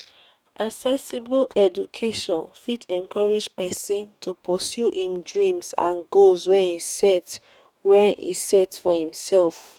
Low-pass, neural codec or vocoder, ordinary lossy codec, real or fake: 19.8 kHz; codec, 44.1 kHz, 2.6 kbps, DAC; none; fake